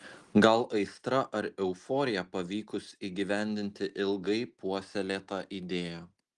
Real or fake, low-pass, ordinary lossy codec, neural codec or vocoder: real; 10.8 kHz; Opus, 24 kbps; none